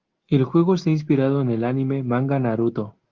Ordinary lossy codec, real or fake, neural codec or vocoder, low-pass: Opus, 16 kbps; real; none; 7.2 kHz